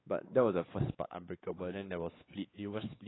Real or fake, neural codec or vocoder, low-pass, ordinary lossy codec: fake; codec, 16 kHz, 4 kbps, X-Codec, WavLM features, trained on Multilingual LibriSpeech; 7.2 kHz; AAC, 16 kbps